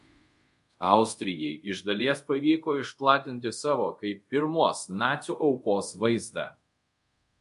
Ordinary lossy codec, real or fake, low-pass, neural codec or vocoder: MP3, 64 kbps; fake; 10.8 kHz; codec, 24 kHz, 0.5 kbps, DualCodec